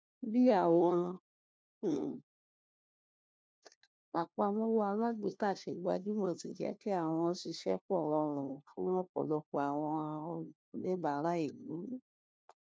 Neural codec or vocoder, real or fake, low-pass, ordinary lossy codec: codec, 16 kHz, 1 kbps, FunCodec, trained on LibriTTS, 50 frames a second; fake; none; none